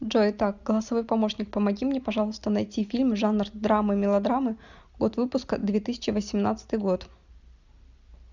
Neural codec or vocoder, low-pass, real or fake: none; 7.2 kHz; real